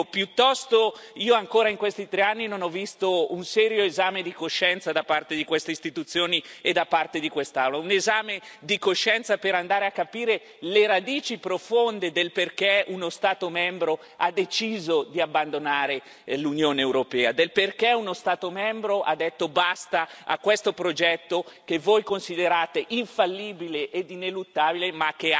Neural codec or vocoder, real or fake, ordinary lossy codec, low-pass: none; real; none; none